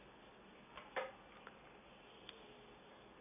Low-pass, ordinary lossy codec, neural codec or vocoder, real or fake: 3.6 kHz; none; none; real